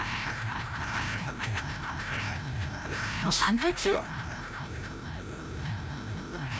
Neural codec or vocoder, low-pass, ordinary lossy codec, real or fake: codec, 16 kHz, 0.5 kbps, FreqCodec, larger model; none; none; fake